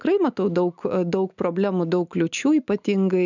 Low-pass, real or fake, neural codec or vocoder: 7.2 kHz; real; none